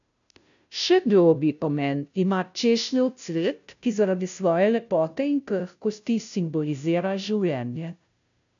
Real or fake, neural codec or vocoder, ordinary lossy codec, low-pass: fake; codec, 16 kHz, 0.5 kbps, FunCodec, trained on Chinese and English, 25 frames a second; none; 7.2 kHz